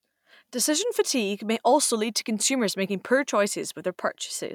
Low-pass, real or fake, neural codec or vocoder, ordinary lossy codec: 19.8 kHz; real; none; none